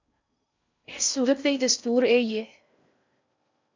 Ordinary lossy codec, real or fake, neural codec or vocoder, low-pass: MP3, 64 kbps; fake; codec, 16 kHz in and 24 kHz out, 0.6 kbps, FocalCodec, streaming, 4096 codes; 7.2 kHz